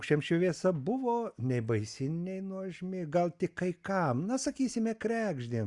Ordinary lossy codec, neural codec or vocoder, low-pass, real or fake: Opus, 64 kbps; none; 10.8 kHz; real